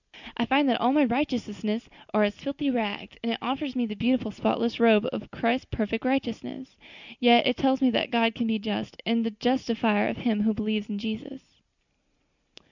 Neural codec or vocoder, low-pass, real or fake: none; 7.2 kHz; real